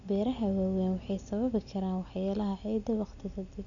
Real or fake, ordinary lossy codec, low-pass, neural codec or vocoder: real; none; 7.2 kHz; none